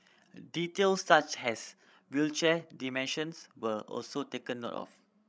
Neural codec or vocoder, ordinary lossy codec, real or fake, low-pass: codec, 16 kHz, 16 kbps, FreqCodec, larger model; none; fake; none